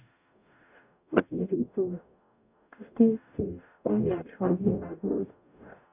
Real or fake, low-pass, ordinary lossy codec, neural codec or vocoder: fake; 3.6 kHz; none; codec, 44.1 kHz, 0.9 kbps, DAC